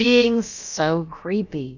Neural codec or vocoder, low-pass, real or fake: codec, 16 kHz, about 1 kbps, DyCAST, with the encoder's durations; 7.2 kHz; fake